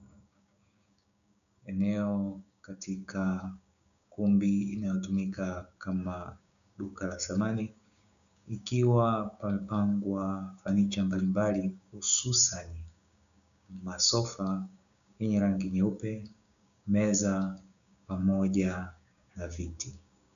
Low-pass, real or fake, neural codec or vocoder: 7.2 kHz; fake; codec, 16 kHz, 6 kbps, DAC